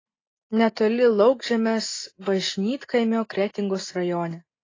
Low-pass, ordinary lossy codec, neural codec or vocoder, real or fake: 7.2 kHz; AAC, 32 kbps; none; real